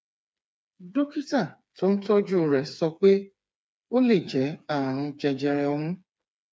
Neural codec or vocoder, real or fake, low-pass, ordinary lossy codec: codec, 16 kHz, 4 kbps, FreqCodec, smaller model; fake; none; none